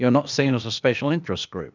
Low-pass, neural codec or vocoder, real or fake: 7.2 kHz; codec, 16 kHz, 0.8 kbps, ZipCodec; fake